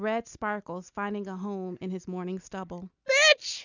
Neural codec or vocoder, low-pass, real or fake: none; 7.2 kHz; real